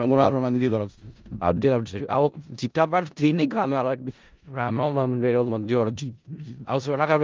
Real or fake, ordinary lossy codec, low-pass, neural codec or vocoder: fake; Opus, 32 kbps; 7.2 kHz; codec, 16 kHz in and 24 kHz out, 0.4 kbps, LongCat-Audio-Codec, four codebook decoder